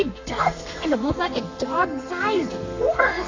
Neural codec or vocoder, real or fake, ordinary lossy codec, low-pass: codec, 32 kHz, 1.9 kbps, SNAC; fake; AAC, 32 kbps; 7.2 kHz